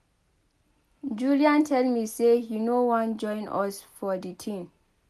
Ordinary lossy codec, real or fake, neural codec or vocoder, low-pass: none; real; none; 14.4 kHz